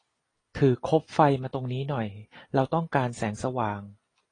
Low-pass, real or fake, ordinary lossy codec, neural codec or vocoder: 9.9 kHz; real; AAC, 32 kbps; none